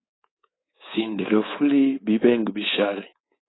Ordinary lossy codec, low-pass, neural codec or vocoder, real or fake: AAC, 16 kbps; 7.2 kHz; codec, 24 kHz, 3.1 kbps, DualCodec; fake